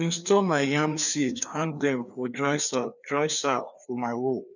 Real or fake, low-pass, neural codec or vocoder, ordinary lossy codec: fake; 7.2 kHz; codec, 16 kHz, 2 kbps, FreqCodec, larger model; none